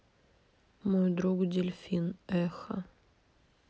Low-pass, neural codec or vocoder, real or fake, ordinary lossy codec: none; none; real; none